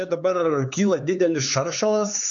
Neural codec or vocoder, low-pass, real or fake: codec, 16 kHz, 4 kbps, X-Codec, HuBERT features, trained on LibriSpeech; 7.2 kHz; fake